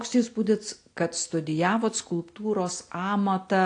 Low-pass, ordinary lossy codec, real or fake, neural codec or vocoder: 9.9 kHz; AAC, 48 kbps; real; none